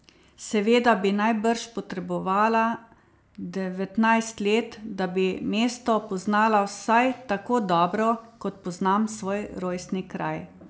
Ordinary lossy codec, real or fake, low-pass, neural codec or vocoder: none; real; none; none